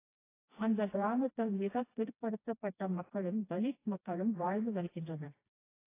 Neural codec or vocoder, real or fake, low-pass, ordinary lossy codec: codec, 16 kHz, 1 kbps, FreqCodec, smaller model; fake; 3.6 kHz; AAC, 24 kbps